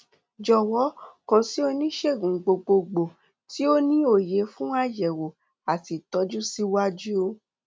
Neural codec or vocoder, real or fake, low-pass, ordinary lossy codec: none; real; none; none